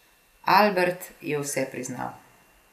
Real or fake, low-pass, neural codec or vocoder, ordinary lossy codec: real; 14.4 kHz; none; none